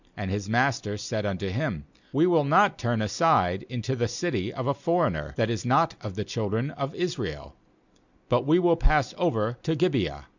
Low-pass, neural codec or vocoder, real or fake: 7.2 kHz; none; real